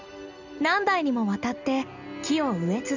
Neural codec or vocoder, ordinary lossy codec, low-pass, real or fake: none; none; 7.2 kHz; real